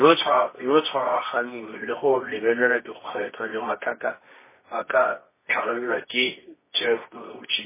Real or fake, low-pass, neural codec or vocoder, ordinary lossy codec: fake; 3.6 kHz; codec, 24 kHz, 0.9 kbps, WavTokenizer, medium music audio release; MP3, 16 kbps